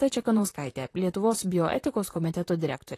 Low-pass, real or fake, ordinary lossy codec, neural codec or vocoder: 14.4 kHz; fake; AAC, 48 kbps; vocoder, 44.1 kHz, 128 mel bands, Pupu-Vocoder